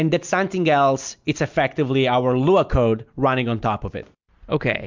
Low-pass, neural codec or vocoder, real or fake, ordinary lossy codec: 7.2 kHz; none; real; MP3, 64 kbps